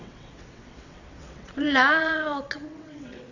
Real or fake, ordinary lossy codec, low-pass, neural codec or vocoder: fake; none; 7.2 kHz; vocoder, 22.05 kHz, 80 mel bands, WaveNeXt